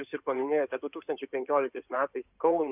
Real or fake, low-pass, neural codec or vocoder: fake; 3.6 kHz; codec, 16 kHz in and 24 kHz out, 2.2 kbps, FireRedTTS-2 codec